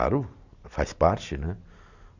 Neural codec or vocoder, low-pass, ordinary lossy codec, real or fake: none; 7.2 kHz; none; real